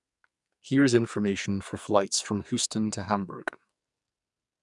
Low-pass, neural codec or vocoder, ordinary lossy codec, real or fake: 10.8 kHz; codec, 44.1 kHz, 2.6 kbps, SNAC; none; fake